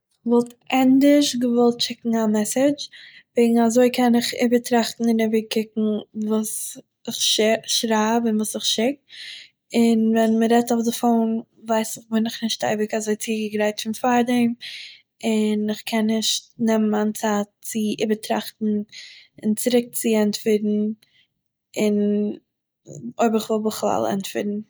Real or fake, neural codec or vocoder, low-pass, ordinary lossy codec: real; none; none; none